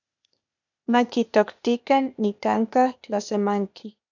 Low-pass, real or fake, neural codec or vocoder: 7.2 kHz; fake; codec, 16 kHz, 0.8 kbps, ZipCodec